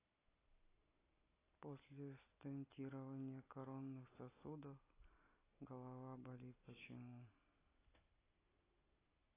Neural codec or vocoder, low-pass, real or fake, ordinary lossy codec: none; 3.6 kHz; real; AAC, 16 kbps